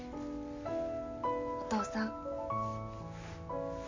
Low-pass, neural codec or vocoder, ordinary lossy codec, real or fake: 7.2 kHz; none; MP3, 48 kbps; real